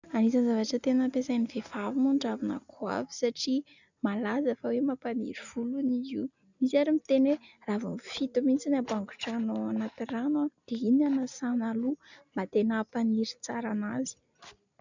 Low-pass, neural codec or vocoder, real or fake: 7.2 kHz; none; real